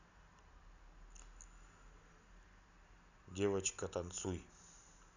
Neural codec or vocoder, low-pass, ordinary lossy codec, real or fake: none; 7.2 kHz; none; real